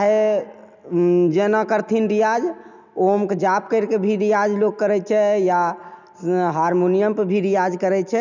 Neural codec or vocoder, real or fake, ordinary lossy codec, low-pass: none; real; none; 7.2 kHz